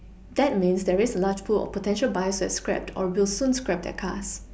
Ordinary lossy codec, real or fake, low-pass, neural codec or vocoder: none; real; none; none